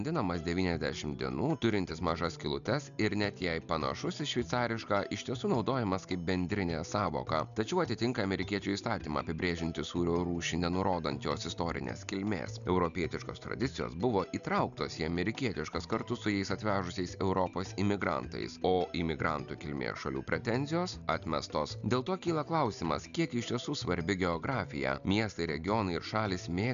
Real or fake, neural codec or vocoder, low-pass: real; none; 7.2 kHz